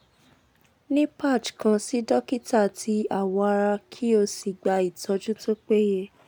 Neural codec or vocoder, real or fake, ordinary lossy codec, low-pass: none; real; none; none